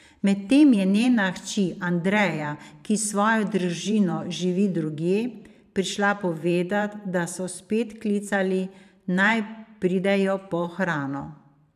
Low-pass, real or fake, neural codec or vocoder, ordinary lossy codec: 14.4 kHz; fake; vocoder, 44.1 kHz, 128 mel bands every 512 samples, BigVGAN v2; none